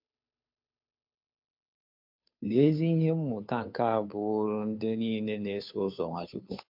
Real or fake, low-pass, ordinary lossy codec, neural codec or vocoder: fake; 5.4 kHz; MP3, 48 kbps; codec, 16 kHz, 2 kbps, FunCodec, trained on Chinese and English, 25 frames a second